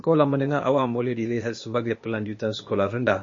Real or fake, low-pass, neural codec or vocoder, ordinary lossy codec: fake; 7.2 kHz; codec, 16 kHz, 0.8 kbps, ZipCodec; MP3, 32 kbps